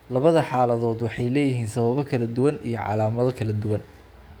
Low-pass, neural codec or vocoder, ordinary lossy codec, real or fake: none; codec, 44.1 kHz, 7.8 kbps, DAC; none; fake